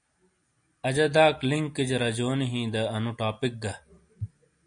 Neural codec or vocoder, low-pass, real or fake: none; 9.9 kHz; real